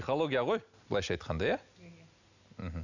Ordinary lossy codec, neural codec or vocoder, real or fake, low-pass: none; none; real; 7.2 kHz